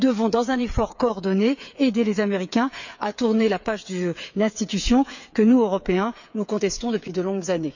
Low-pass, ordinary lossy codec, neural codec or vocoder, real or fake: 7.2 kHz; none; codec, 16 kHz, 8 kbps, FreqCodec, smaller model; fake